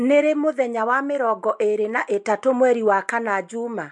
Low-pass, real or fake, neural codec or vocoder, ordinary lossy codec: 10.8 kHz; real; none; MP3, 64 kbps